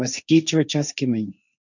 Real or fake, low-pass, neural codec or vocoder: fake; 7.2 kHz; codec, 16 kHz, 1.1 kbps, Voila-Tokenizer